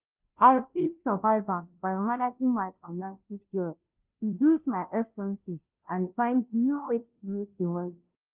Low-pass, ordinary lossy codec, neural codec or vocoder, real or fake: 3.6 kHz; Opus, 24 kbps; codec, 16 kHz, 0.5 kbps, FunCodec, trained on Chinese and English, 25 frames a second; fake